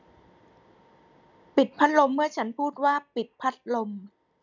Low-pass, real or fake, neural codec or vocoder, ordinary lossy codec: 7.2 kHz; real; none; none